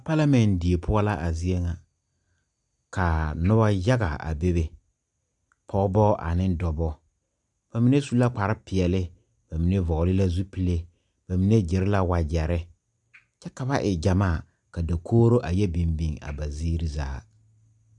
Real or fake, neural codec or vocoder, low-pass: real; none; 10.8 kHz